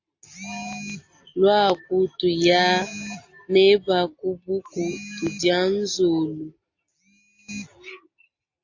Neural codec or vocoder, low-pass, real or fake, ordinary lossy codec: none; 7.2 kHz; real; AAC, 48 kbps